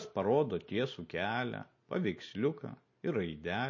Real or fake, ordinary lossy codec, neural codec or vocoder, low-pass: real; MP3, 32 kbps; none; 7.2 kHz